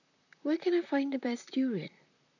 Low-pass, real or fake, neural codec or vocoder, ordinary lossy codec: 7.2 kHz; fake; vocoder, 44.1 kHz, 128 mel bands, Pupu-Vocoder; none